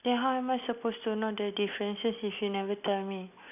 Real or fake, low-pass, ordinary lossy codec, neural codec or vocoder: real; 3.6 kHz; none; none